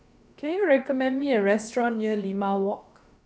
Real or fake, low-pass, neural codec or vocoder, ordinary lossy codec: fake; none; codec, 16 kHz, about 1 kbps, DyCAST, with the encoder's durations; none